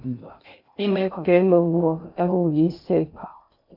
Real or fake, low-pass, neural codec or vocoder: fake; 5.4 kHz; codec, 16 kHz in and 24 kHz out, 0.6 kbps, FocalCodec, streaming, 2048 codes